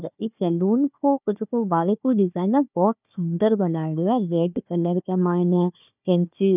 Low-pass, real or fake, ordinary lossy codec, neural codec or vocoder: 3.6 kHz; fake; none; codec, 16 kHz, 1 kbps, FunCodec, trained on Chinese and English, 50 frames a second